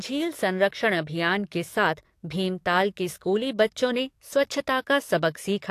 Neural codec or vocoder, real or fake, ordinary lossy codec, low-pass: codec, 44.1 kHz, 7.8 kbps, DAC; fake; AAC, 64 kbps; 14.4 kHz